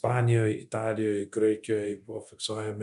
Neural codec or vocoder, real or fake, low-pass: codec, 24 kHz, 0.9 kbps, DualCodec; fake; 10.8 kHz